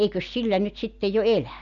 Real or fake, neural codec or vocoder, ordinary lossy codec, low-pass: real; none; none; 7.2 kHz